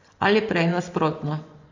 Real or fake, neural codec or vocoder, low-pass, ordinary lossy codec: fake; vocoder, 24 kHz, 100 mel bands, Vocos; 7.2 kHz; AAC, 48 kbps